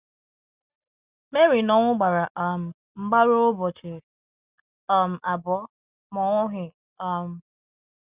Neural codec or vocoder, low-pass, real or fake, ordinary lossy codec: none; 3.6 kHz; real; Opus, 64 kbps